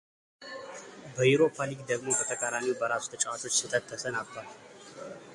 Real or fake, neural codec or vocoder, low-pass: real; none; 10.8 kHz